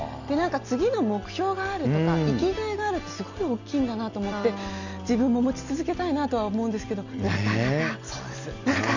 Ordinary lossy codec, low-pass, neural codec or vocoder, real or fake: none; 7.2 kHz; none; real